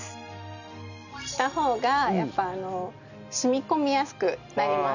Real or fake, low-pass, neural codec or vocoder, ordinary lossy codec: real; 7.2 kHz; none; none